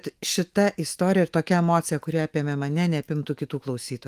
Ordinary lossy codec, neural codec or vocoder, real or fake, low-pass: Opus, 24 kbps; autoencoder, 48 kHz, 128 numbers a frame, DAC-VAE, trained on Japanese speech; fake; 14.4 kHz